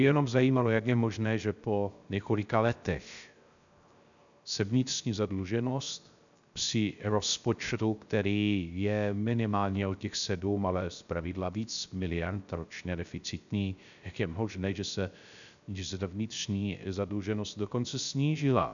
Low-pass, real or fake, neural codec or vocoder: 7.2 kHz; fake; codec, 16 kHz, 0.3 kbps, FocalCodec